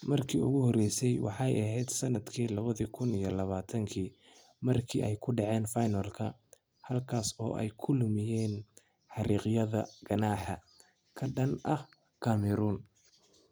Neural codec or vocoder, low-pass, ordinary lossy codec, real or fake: none; none; none; real